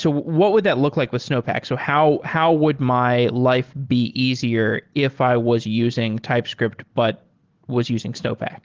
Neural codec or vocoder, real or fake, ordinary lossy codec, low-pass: none; real; Opus, 16 kbps; 7.2 kHz